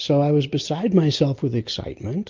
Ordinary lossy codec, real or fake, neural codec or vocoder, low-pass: Opus, 24 kbps; real; none; 7.2 kHz